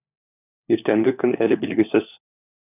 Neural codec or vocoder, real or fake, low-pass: codec, 16 kHz, 4 kbps, FunCodec, trained on LibriTTS, 50 frames a second; fake; 3.6 kHz